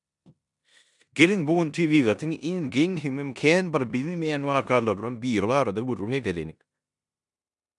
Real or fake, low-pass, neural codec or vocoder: fake; 10.8 kHz; codec, 16 kHz in and 24 kHz out, 0.9 kbps, LongCat-Audio-Codec, four codebook decoder